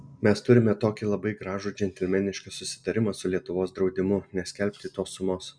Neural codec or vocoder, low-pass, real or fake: none; 9.9 kHz; real